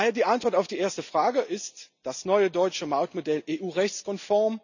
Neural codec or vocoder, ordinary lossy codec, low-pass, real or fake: none; none; 7.2 kHz; real